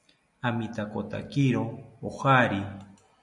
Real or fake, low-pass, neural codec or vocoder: real; 10.8 kHz; none